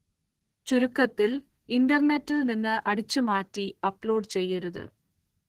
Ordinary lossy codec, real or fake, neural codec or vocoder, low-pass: Opus, 16 kbps; fake; codec, 32 kHz, 1.9 kbps, SNAC; 14.4 kHz